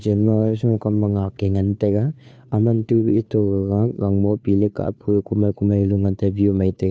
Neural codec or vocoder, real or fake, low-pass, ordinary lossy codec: codec, 16 kHz, 2 kbps, FunCodec, trained on Chinese and English, 25 frames a second; fake; none; none